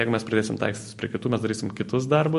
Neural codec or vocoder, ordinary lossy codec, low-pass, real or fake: none; MP3, 48 kbps; 14.4 kHz; real